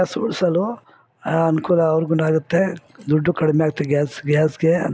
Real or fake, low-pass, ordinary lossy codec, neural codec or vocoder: real; none; none; none